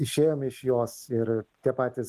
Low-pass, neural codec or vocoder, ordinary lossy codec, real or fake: 14.4 kHz; none; Opus, 24 kbps; real